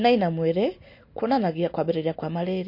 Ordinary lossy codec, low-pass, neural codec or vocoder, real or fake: MP3, 32 kbps; 5.4 kHz; none; real